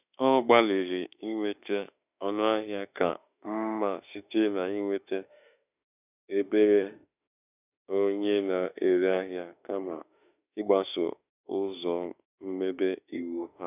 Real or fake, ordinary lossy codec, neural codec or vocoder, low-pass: fake; none; autoencoder, 48 kHz, 32 numbers a frame, DAC-VAE, trained on Japanese speech; 3.6 kHz